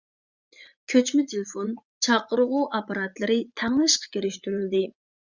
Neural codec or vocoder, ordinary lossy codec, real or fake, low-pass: vocoder, 44.1 kHz, 80 mel bands, Vocos; Opus, 64 kbps; fake; 7.2 kHz